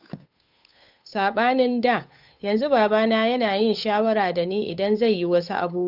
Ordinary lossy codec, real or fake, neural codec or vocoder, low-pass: none; fake; codec, 16 kHz, 8 kbps, FunCodec, trained on Chinese and English, 25 frames a second; 5.4 kHz